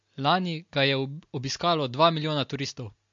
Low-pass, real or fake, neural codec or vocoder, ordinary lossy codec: 7.2 kHz; real; none; MP3, 48 kbps